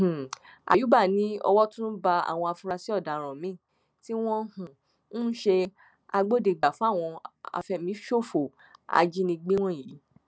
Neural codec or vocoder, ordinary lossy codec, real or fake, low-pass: none; none; real; none